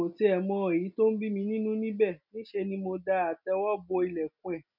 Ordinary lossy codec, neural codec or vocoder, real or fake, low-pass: none; none; real; 5.4 kHz